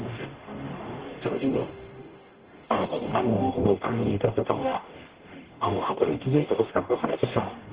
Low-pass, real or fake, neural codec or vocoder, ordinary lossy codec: 3.6 kHz; fake; codec, 44.1 kHz, 0.9 kbps, DAC; Opus, 16 kbps